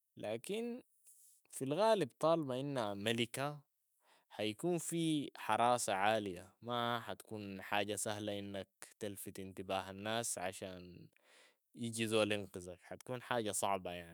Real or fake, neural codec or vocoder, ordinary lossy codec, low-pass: fake; autoencoder, 48 kHz, 128 numbers a frame, DAC-VAE, trained on Japanese speech; none; none